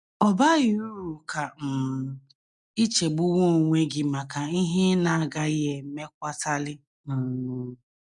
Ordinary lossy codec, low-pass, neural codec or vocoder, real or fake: none; 10.8 kHz; none; real